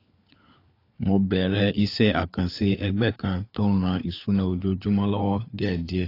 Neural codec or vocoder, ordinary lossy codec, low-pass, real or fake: codec, 16 kHz, 4 kbps, FunCodec, trained on LibriTTS, 50 frames a second; AAC, 32 kbps; 5.4 kHz; fake